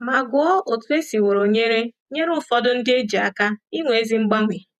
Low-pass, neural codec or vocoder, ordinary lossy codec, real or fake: 14.4 kHz; vocoder, 48 kHz, 128 mel bands, Vocos; none; fake